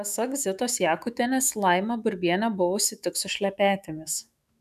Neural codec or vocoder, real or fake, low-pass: autoencoder, 48 kHz, 128 numbers a frame, DAC-VAE, trained on Japanese speech; fake; 14.4 kHz